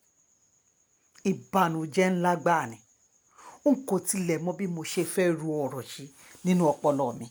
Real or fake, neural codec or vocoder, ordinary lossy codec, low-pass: real; none; none; none